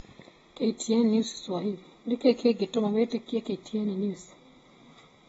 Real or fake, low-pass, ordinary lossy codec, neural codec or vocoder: real; 19.8 kHz; AAC, 24 kbps; none